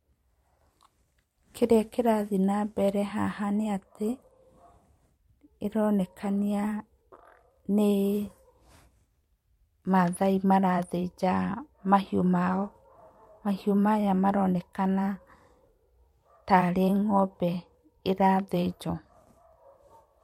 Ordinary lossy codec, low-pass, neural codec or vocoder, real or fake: MP3, 64 kbps; 19.8 kHz; vocoder, 44.1 kHz, 128 mel bands every 512 samples, BigVGAN v2; fake